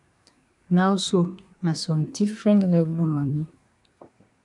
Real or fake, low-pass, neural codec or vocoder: fake; 10.8 kHz; codec, 24 kHz, 1 kbps, SNAC